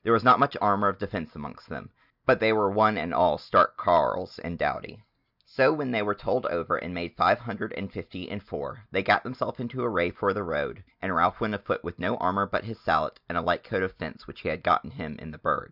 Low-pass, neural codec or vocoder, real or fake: 5.4 kHz; none; real